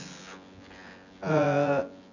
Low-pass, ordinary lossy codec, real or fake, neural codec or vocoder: 7.2 kHz; none; fake; vocoder, 24 kHz, 100 mel bands, Vocos